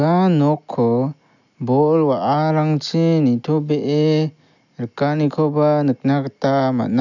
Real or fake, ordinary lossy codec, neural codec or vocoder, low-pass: real; none; none; 7.2 kHz